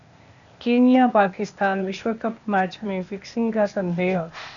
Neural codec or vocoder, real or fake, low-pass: codec, 16 kHz, 0.8 kbps, ZipCodec; fake; 7.2 kHz